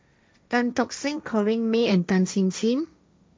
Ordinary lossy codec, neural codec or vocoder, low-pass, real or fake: none; codec, 16 kHz, 1.1 kbps, Voila-Tokenizer; none; fake